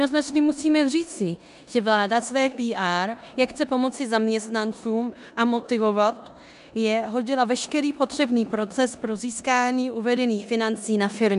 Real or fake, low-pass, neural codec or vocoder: fake; 10.8 kHz; codec, 16 kHz in and 24 kHz out, 0.9 kbps, LongCat-Audio-Codec, four codebook decoder